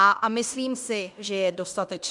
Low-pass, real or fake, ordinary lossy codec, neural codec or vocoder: 10.8 kHz; fake; MP3, 96 kbps; codec, 16 kHz in and 24 kHz out, 0.9 kbps, LongCat-Audio-Codec, fine tuned four codebook decoder